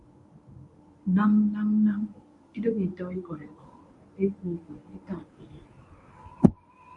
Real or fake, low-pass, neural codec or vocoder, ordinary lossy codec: fake; 10.8 kHz; codec, 24 kHz, 0.9 kbps, WavTokenizer, medium speech release version 1; MP3, 64 kbps